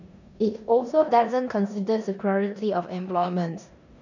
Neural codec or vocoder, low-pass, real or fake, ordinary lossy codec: codec, 16 kHz in and 24 kHz out, 0.9 kbps, LongCat-Audio-Codec, four codebook decoder; 7.2 kHz; fake; none